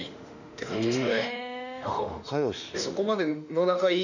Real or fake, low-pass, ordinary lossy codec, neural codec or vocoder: fake; 7.2 kHz; none; autoencoder, 48 kHz, 32 numbers a frame, DAC-VAE, trained on Japanese speech